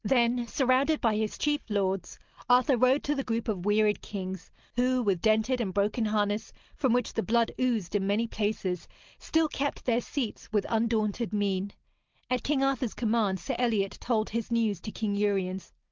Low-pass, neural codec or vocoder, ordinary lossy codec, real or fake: 7.2 kHz; none; Opus, 32 kbps; real